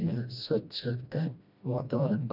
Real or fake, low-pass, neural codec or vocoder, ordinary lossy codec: fake; 5.4 kHz; codec, 16 kHz, 1 kbps, FreqCodec, smaller model; none